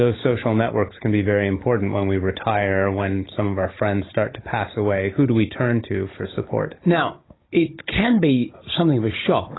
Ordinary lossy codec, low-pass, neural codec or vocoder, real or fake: AAC, 16 kbps; 7.2 kHz; codec, 16 kHz, 16 kbps, FunCodec, trained on Chinese and English, 50 frames a second; fake